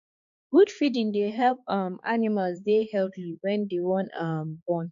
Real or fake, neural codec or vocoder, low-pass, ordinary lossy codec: fake; codec, 16 kHz, 4 kbps, X-Codec, HuBERT features, trained on balanced general audio; 7.2 kHz; MP3, 64 kbps